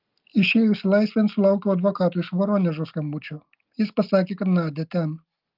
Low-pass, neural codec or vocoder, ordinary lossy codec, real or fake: 5.4 kHz; none; Opus, 32 kbps; real